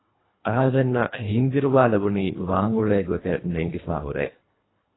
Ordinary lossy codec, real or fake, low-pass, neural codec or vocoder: AAC, 16 kbps; fake; 7.2 kHz; codec, 24 kHz, 1.5 kbps, HILCodec